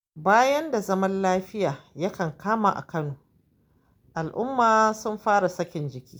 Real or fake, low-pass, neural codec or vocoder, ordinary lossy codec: real; none; none; none